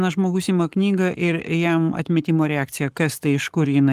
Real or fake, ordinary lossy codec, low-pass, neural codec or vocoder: fake; Opus, 24 kbps; 14.4 kHz; codec, 44.1 kHz, 7.8 kbps, Pupu-Codec